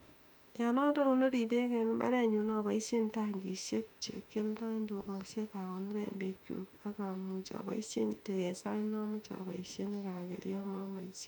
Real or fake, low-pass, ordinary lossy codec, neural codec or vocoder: fake; 19.8 kHz; none; autoencoder, 48 kHz, 32 numbers a frame, DAC-VAE, trained on Japanese speech